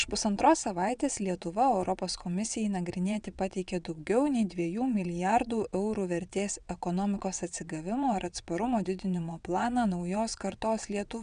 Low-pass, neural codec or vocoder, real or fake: 9.9 kHz; vocoder, 22.05 kHz, 80 mel bands, WaveNeXt; fake